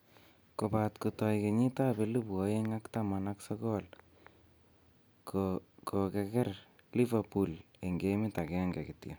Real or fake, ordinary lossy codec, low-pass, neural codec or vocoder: real; none; none; none